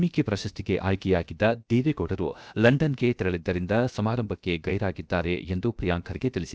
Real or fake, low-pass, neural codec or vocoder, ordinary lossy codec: fake; none; codec, 16 kHz, about 1 kbps, DyCAST, with the encoder's durations; none